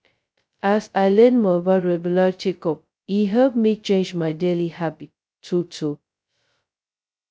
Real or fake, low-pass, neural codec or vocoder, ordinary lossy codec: fake; none; codec, 16 kHz, 0.2 kbps, FocalCodec; none